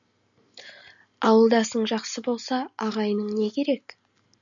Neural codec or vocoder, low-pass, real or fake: none; 7.2 kHz; real